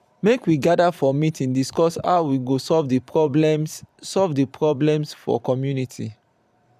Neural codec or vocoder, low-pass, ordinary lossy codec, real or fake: vocoder, 44.1 kHz, 128 mel bands every 512 samples, BigVGAN v2; 14.4 kHz; none; fake